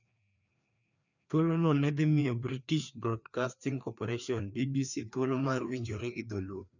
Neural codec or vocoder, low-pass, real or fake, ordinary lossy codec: codec, 16 kHz, 2 kbps, FreqCodec, larger model; 7.2 kHz; fake; none